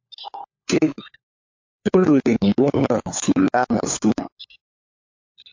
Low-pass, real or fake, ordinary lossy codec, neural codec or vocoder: 7.2 kHz; fake; MP3, 48 kbps; codec, 16 kHz, 4 kbps, FunCodec, trained on LibriTTS, 50 frames a second